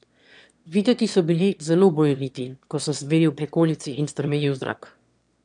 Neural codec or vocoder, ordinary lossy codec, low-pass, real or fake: autoencoder, 22.05 kHz, a latent of 192 numbers a frame, VITS, trained on one speaker; none; 9.9 kHz; fake